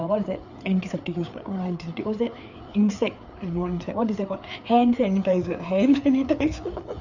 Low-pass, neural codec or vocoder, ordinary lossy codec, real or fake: 7.2 kHz; codec, 16 kHz, 4 kbps, FreqCodec, larger model; none; fake